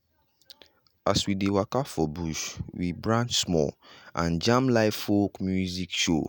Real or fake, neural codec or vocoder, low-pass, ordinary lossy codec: real; none; none; none